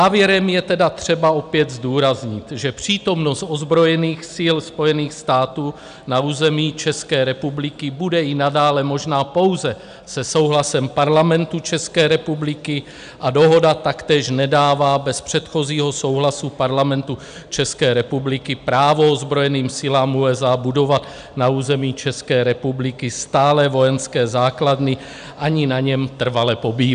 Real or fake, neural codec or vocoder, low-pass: real; none; 9.9 kHz